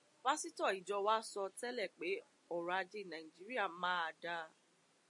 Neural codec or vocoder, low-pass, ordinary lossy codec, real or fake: none; 10.8 kHz; MP3, 48 kbps; real